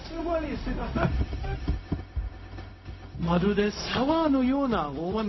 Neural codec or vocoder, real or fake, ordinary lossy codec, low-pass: codec, 16 kHz, 0.4 kbps, LongCat-Audio-Codec; fake; MP3, 24 kbps; 7.2 kHz